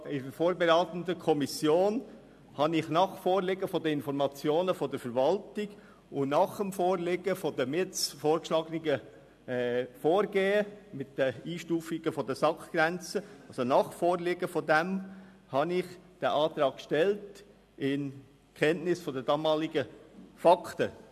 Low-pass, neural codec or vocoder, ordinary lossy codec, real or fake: 14.4 kHz; none; AAC, 96 kbps; real